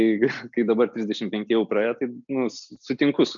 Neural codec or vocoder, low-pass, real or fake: none; 7.2 kHz; real